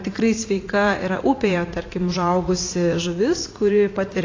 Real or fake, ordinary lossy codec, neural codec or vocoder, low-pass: fake; AAC, 32 kbps; vocoder, 44.1 kHz, 80 mel bands, Vocos; 7.2 kHz